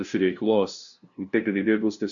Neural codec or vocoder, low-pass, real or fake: codec, 16 kHz, 0.5 kbps, FunCodec, trained on LibriTTS, 25 frames a second; 7.2 kHz; fake